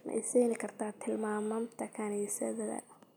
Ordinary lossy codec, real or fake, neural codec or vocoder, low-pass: none; real; none; none